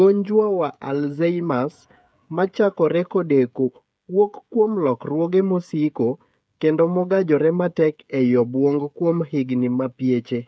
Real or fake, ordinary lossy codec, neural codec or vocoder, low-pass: fake; none; codec, 16 kHz, 16 kbps, FreqCodec, smaller model; none